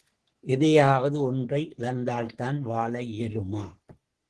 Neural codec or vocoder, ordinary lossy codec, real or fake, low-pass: codec, 44.1 kHz, 3.4 kbps, Pupu-Codec; Opus, 16 kbps; fake; 10.8 kHz